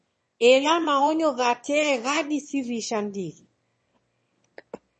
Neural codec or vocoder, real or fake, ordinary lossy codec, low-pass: autoencoder, 22.05 kHz, a latent of 192 numbers a frame, VITS, trained on one speaker; fake; MP3, 32 kbps; 9.9 kHz